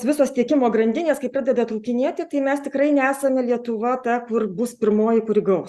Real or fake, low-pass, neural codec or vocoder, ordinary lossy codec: real; 14.4 kHz; none; Opus, 64 kbps